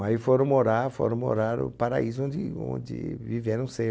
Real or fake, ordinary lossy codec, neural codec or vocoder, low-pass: real; none; none; none